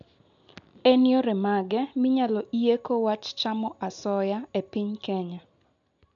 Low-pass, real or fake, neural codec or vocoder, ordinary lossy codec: 7.2 kHz; real; none; none